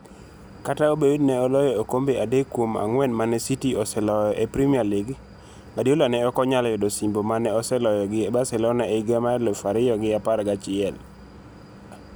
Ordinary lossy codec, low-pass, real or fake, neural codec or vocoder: none; none; real; none